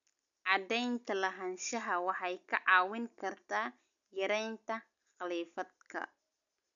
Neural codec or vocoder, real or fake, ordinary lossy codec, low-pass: none; real; none; 7.2 kHz